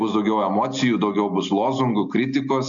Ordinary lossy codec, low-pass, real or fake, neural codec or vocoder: MP3, 96 kbps; 7.2 kHz; real; none